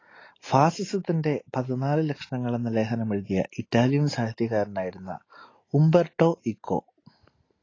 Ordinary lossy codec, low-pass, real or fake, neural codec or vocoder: AAC, 32 kbps; 7.2 kHz; real; none